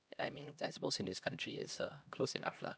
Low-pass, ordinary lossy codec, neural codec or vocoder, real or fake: none; none; codec, 16 kHz, 1 kbps, X-Codec, HuBERT features, trained on LibriSpeech; fake